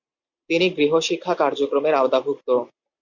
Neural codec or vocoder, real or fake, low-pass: none; real; 7.2 kHz